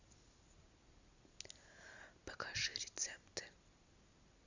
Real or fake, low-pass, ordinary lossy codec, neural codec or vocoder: real; 7.2 kHz; Opus, 64 kbps; none